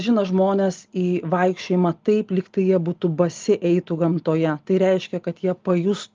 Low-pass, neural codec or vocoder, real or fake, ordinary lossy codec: 7.2 kHz; none; real; Opus, 24 kbps